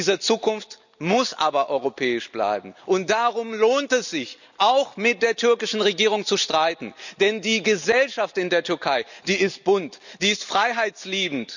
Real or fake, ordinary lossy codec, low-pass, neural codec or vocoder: real; none; 7.2 kHz; none